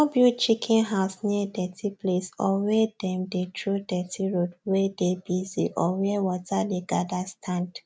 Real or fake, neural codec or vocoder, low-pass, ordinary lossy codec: real; none; none; none